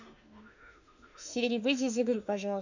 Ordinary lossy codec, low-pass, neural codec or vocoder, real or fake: none; 7.2 kHz; autoencoder, 48 kHz, 32 numbers a frame, DAC-VAE, trained on Japanese speech; fake